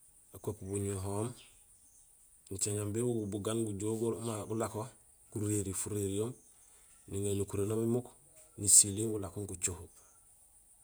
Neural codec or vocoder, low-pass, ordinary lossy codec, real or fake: vocoder, 48 kHz, 128 mel bands, Vocos; none; none; fake